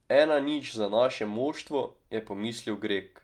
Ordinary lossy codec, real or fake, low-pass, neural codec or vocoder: Opus, 32 kbps; real; 19.8 kHz; none